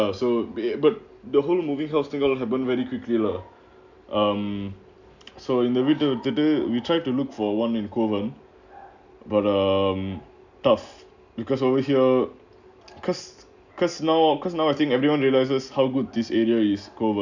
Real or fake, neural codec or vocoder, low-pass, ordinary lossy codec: real; none; 7.2 kHz; none